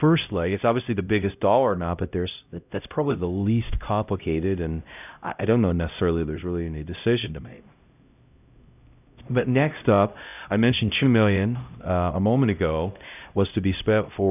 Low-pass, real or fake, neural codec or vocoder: 3.6 kHz; fake; codec, 16 kHz, 0.5 kbps, X-Codec, HuBERT features, trained on LibriSpeech